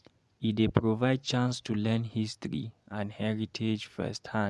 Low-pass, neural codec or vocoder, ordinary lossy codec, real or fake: none; vocoder, 24 kHz, 100 mel bands, Vocos; none; fake